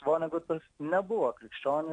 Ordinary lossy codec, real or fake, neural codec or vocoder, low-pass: AAC, 48 kbps; real; none; 9.9 kHz